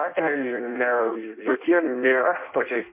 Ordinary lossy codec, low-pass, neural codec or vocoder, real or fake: MP3, 32 kbps; 3.6 kHz; codec, 16 kHz in and 24 kHz out, 0.6 kbps, FireRedTTS-2 codec; fake